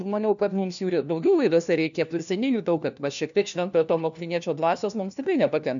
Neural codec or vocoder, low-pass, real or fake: codec, 16 kHz, 1 kbps, FunCodec, trained on LibriTTS, 50 frames a second; 7.2 kHz; fake